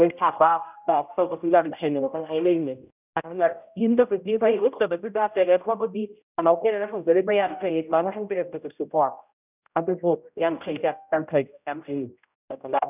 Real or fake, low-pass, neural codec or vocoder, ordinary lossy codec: fake; 3.6 kHz; codec, 16 kHz, 0.5 kbps, X-Codec, HuBERT features, trained on general audio; none